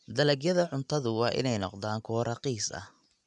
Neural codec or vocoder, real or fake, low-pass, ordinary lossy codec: none; real; 10.8 kHz; none